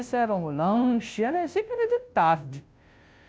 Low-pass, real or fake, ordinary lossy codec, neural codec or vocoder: none; fake; none; codec, 16 kHz, 0.5 kbps, FunCodec, trained on Chinese and English, 25 frames a second